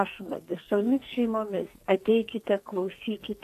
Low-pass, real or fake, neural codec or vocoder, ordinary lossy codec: 14.4 kHz; fake; codec, 44.1 kHz, 2.6 kbps, SNAC; AAC, 64 kbps